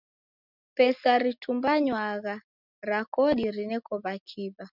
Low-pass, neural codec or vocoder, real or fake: 5.4 kHz; none; real